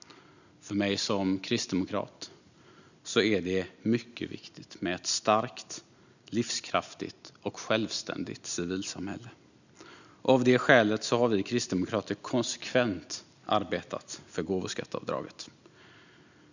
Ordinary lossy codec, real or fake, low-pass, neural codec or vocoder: none; real; 7.2 kHz; none